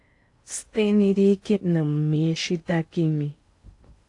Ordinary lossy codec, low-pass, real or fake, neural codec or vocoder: AAC, 48 kbps; 10.8 kHz; fake; codec, 16 kHz in and 24 kHz out, 0.6 kbps, FocalCodec, streaming, 2048 codes